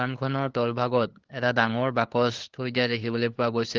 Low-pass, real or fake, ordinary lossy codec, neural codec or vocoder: 7.2 kHz; fake; Opus, 16 kbps; codec, 16 kHz, 2 kbps, FunCodec, trained on LibriTTS, 25 frames a second